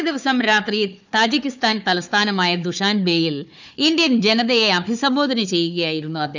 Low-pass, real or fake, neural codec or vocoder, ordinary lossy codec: 7.2 kHz; fake; codec, 16 kHz, 4 kbps, FunCodec, trained on Chinese and English, 50 frames a second; none